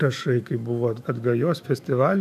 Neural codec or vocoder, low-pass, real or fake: autoencoder, 48 kHz, 128 numbers a frame, DAC-VAE, trained on Japanese speech; 14.4 kHz; fake